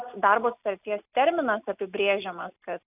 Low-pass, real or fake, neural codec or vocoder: 3.6 kHz; real; none